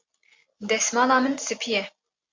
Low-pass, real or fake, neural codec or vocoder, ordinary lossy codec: 7.2 kHz; real; none; MP3, 48 kbps